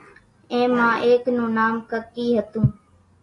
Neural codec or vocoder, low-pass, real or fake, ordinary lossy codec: none; 10.8 kHz; real; AAC, 32 kbps